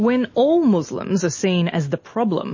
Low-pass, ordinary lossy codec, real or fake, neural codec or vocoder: 7.2 kHz; MP3, 32 kbps; real; none